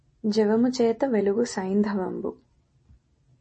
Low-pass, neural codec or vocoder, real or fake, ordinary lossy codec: 10.8 kHz; none; real; MP3, 32 kbps